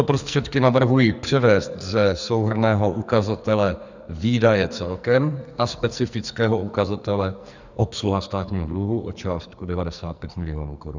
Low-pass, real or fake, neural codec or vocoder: 7.2 kHz; fake; codec, 44.1 kHz, 2.6 kbps, SNAC